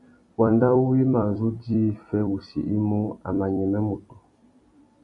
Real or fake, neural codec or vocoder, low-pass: fake; vocoder, 44.1 kHz, 128 mel bands every 256 samples, BigVGAN v2; 10.8 kHz